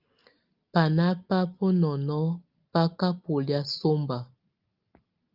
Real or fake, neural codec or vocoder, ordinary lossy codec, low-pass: real; none; Opus, 32 kbps; 5.4 kHz